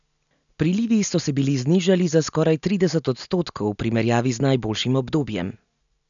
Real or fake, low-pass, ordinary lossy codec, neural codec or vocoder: real; 7.2 kHz; none; none